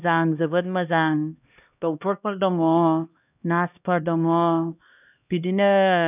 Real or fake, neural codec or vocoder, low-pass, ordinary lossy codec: fake; codec, 16 kHz, 1 kbps, X-Codec, HuBERT features, trained on LibriSpeech; 3.6 kHz; none